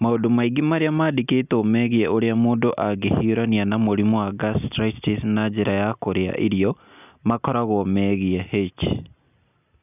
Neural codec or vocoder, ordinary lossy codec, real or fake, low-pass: none; none; real; 3.6 kHz